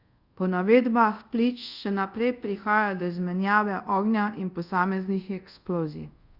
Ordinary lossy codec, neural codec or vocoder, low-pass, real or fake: Opus, 64 kbps; codec, 24 kHz, 0.5 kbps, DualCodec; 5.4 kHz; fake